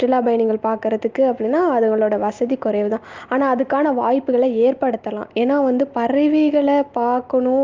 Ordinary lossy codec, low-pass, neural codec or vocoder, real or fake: Opus, 24 kbps; 7.2 kHz; none; real